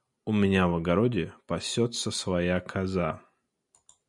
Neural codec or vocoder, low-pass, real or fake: none; 10.8 kHz; real